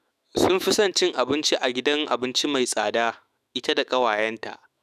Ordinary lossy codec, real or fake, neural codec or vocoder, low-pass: none; fake; autoencoder, 48 kHz, 128 numbers a frame, DAC-VAE, trained on Japanese speech; 14.4 kHz